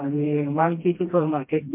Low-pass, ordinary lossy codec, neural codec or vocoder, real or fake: 3.6 kHz; MP3, 32 kbps; codec, 16 kHz, 1 kbps, FreqCodec, smaller model; fake